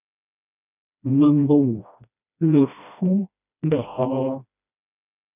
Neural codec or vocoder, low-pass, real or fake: codec, 16 kHz, 1 kbps, FreqCodec, smaller model; 3.6 kHz; fake